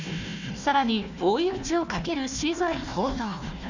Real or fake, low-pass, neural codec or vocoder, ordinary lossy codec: fake; 7.2 kHz; codec, 16 kHz, 1 kbps, FunCodec, trained on Chinese and English, 50 frames a second; none